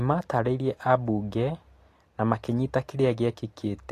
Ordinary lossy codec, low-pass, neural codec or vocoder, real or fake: AAC, 48 kbps; 14.4 kHz; none; real